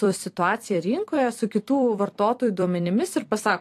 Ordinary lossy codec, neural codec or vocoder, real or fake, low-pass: AAC, 64 kbps; vocoder, 44.1 kHz, 128 mel bands every 256 samples, BigVGAN v2; fake; 14.4 kHz